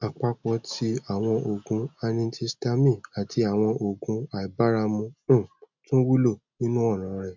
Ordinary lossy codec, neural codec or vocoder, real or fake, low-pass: MP3, 64 kbps; none; real; 7.2 kHz